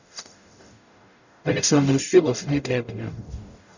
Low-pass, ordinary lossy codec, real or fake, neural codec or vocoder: 7.2 kHz; none; fake; codec, 44.1 kHz, 0.9 kbps, DAC